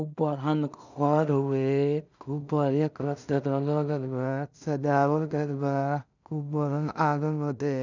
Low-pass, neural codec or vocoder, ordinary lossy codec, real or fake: 7.2 kHz; codec, 16 kHz in and 24 kHz out, 0.4 kbps, LongCat-Audio-Codec, two codebook decoder; none; fake